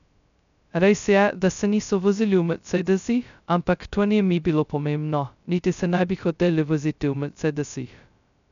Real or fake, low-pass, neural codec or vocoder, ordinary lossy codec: fake; 7.2 kHz; codec, 16 kHz, 0.2 kbps, FocalCodec; none